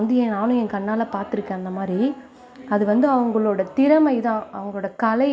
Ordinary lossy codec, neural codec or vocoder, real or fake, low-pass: none; none; real; none